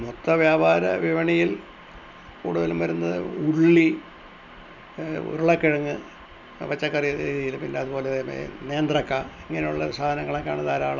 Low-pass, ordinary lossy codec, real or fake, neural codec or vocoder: 7.2 kHz; none; real; none